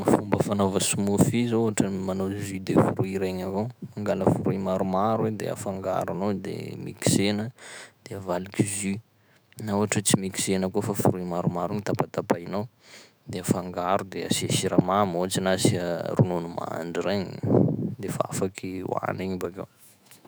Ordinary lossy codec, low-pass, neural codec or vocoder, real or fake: none; none; autoencoder, 48 kHz, 128 numbers a frame, DAC-VAE, trained on Japanese speech; fake